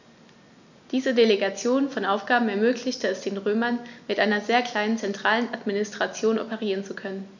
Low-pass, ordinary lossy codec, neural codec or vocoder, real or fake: 7.2 kHz; none; none; real